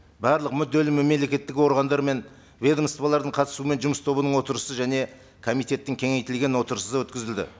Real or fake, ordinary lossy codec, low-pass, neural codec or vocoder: real; none; none; none